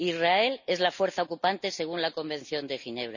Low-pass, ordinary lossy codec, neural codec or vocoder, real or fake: 7.2 kHz; none; none; real